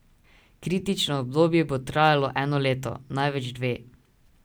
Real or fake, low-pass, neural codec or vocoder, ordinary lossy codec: real; none; none; none